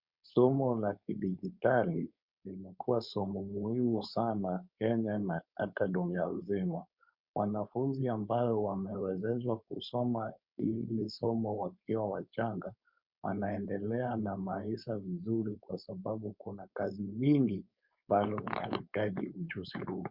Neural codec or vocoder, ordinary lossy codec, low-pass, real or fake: codec, 16 kHz, 4.8 kbps, FACodec; Opus, 64 kbps; 5.4 kHz; fake